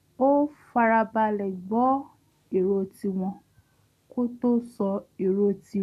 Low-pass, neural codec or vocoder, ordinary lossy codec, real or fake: 14.4 kHz; none; none; real